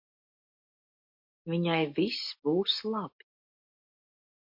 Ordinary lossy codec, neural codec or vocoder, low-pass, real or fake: MP3, 32 kbps; none; 5.4 kHz; real